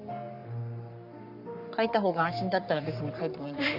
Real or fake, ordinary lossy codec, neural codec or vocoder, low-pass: fake; Opus, 64 kbps; codec, 44.1 kHz, 3.4 kbps, Pupu-Codec; 5.4 kHz